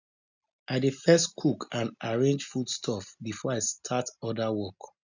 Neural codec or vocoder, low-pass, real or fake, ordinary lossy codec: none; 7.2 kHz; real; none